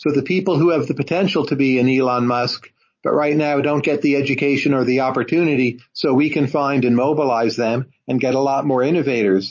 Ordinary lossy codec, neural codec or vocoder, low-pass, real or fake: MP3, 32 kbps; none; 7.2 kHz; real